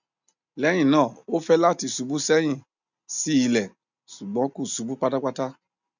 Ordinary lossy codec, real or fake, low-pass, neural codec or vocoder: none; real; 7.2 kHz; none